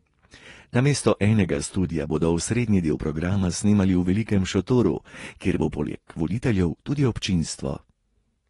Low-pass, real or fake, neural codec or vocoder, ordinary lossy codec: 10.8 kHz; fake; vocoder, 24 kHz, 100 mel bands, Vocos; AAC, 32 kbps